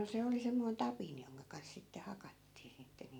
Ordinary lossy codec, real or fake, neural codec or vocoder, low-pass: none; real; none; 19.8 kHz